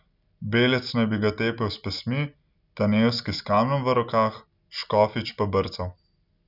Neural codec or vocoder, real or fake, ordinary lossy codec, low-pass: none; real; none; 5.4 kHz